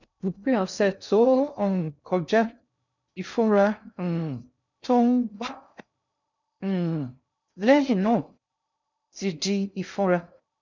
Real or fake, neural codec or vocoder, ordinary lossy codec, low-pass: fake; codec, 16 kHz in and 24 kHz out, 0.6 kbps, FocalCodec, streaming, 2048 codes; none; 7.2 kHz